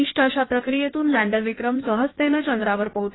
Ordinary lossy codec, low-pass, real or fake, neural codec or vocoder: AAC, 16 kbps; 7.2 kHz; fake; codec, 16 kHz in and 24 kHz out, 1.1 kbps, FireRedTTS-2 codec